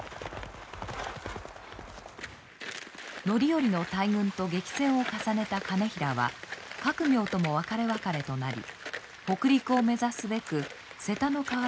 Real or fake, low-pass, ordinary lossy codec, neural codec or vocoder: real; none; none; none